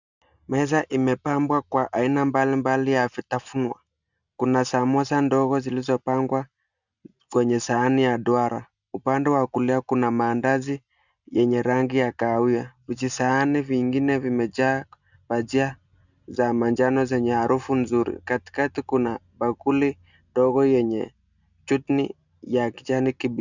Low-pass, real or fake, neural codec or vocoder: 7.2 kHz; real; none